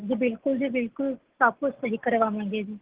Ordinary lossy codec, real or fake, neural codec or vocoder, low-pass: Opus, 16 kbps; real; none; 3.6 kHz